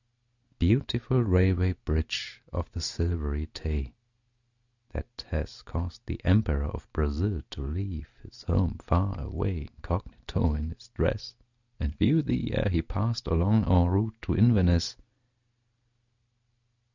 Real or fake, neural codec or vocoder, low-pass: real; none; 7.2 kHz